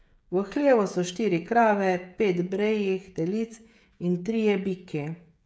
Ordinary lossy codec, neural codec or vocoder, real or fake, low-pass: none; codec, 16 kHz, 16 kbps, FreqCodec, smaller model; fake; none